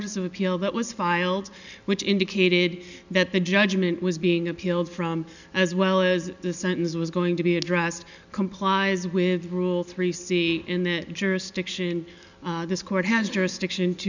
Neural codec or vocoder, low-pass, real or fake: none; 7.2 kHz; real